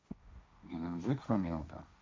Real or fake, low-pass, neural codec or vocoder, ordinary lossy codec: fake; none; codec, 16 kHz, 1.1 kbps, Voila-Tokenizer; none